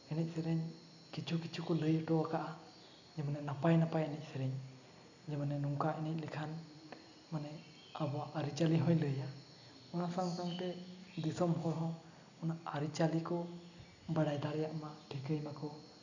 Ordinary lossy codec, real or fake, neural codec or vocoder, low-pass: none; real; none; 7.2 kHz